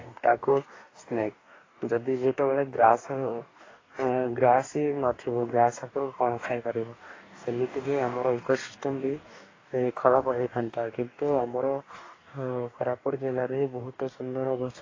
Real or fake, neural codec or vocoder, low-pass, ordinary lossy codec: fake; codec, 44.1 kHz, 2.6 kbps, DAC; 7.2 kHz; AAC, 32 kbps